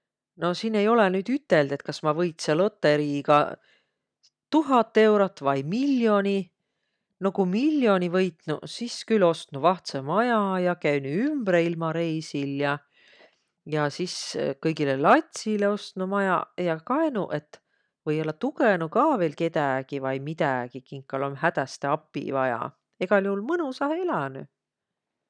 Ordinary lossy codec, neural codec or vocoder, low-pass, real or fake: none; none; 9.9 kHz; real